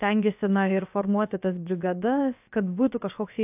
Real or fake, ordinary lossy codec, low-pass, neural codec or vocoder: fake; AAC, 32 kbps; 3.6 kHz; codec, 16 kHz, about 1 kbps, DyCAST, with the encoder's durations